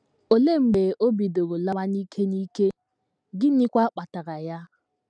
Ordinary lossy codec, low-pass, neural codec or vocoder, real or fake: none; 9.9 kHz; none; real